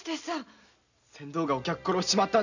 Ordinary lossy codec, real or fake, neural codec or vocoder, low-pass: none; real; none; 7.2 kHz